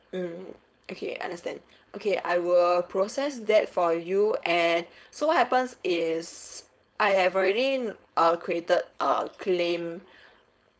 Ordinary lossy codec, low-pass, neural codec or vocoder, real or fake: none; none; codec, 16 kHz, 4.8 kbps, FACodec; fake